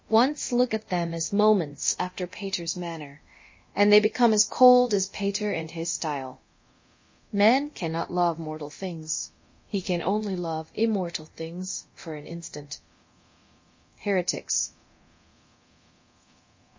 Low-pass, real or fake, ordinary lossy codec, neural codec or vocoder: 7.2 kHz; fake; MP3, 32 kbps; codec, 24 kHz, 0.9 kbps, DualCodec